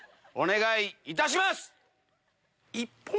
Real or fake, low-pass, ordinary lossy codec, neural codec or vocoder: real; none; none; none